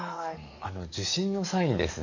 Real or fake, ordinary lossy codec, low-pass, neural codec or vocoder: fake; none; 7.2 kHz; codec, 44.1 kHz, 7.8 kbps, DAC